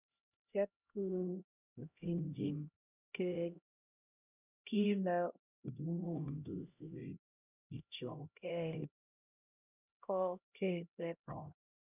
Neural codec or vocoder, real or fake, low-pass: codec, 16 kHz, 0.5 kbps, X-Codec, HuBERT features, trained on LibriSpeech; fake; 3.6 kHz